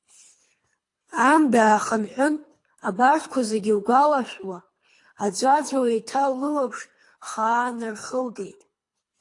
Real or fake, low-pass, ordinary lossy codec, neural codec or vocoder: fake; 10.8 kHz; AAC, 48 kbps; codec, 24 kHz, 3 kbps, HILCodec